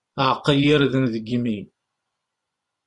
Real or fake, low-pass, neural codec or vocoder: fake; 10.8 kHz; vocoder, 24 kHz, 100 mel bands, Vocos